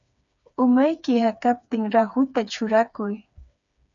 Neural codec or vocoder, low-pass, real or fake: codec, 16 kHz, 4 kbps, FreqCodec, smaller model; 7.2 kHz; fake